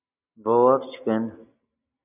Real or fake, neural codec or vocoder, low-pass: real; none; 3.6 kHz